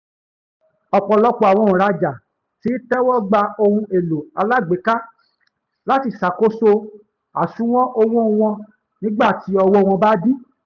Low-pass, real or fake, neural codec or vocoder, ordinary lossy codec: 7.2 kHz; real; none; none